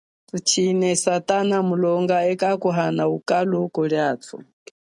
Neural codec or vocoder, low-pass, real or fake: none; 10.8 kHz; real